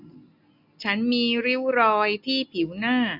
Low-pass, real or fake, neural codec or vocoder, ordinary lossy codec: 5.4 kHz; real; none; none